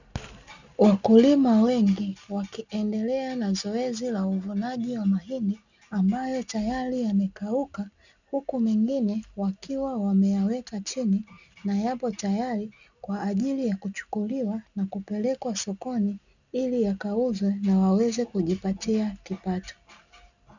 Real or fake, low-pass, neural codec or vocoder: real; 7.2 kHz; none